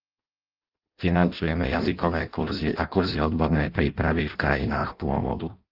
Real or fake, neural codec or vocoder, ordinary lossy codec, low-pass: fake; codec, 16 kHz in and 24 kHz out, 0.6 kbps, FireRedTTS-2 codec; Opus, 24 kbps; 5.4 kHz